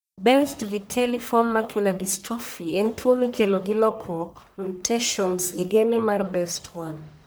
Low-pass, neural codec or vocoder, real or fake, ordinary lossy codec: none; codec, 44.1 kHz, 1.7 kbps, Pupu-Codec; fake; none